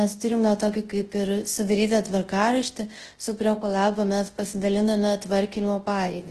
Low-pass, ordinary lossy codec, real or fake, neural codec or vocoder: 10.8 kHz; Opus, 16 kbps; fake; codec, 24 kHz, 0.9 kbps, WavTokenizer, large speech release